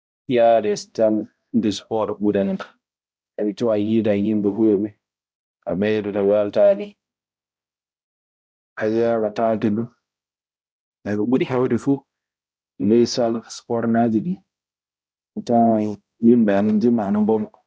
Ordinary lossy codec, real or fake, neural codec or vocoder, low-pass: none; fake; codec, 16 kHz, 0.5 kbps, X-Codec, HuBERT features, trained on balanced general audio; none